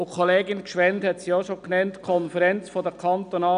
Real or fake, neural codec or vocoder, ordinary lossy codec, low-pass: real; none; none; 9.9 kHz